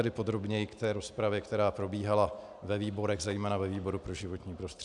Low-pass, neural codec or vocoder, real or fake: 10.8 kHz; none; real